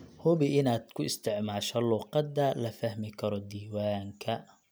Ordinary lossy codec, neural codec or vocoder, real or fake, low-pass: none; none; real; none